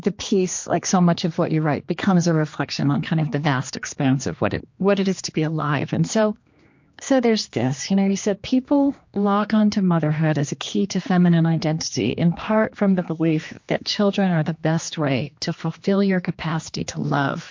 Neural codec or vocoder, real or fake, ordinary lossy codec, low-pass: codec, 16 kHz, 2 kbps, X-Codec, HuBERT features, trained on general audio; fake; MP3, 48 kbps; 7.2 kHz